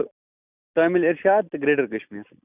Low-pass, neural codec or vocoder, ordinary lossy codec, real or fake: 3.6 kHz; none; none; real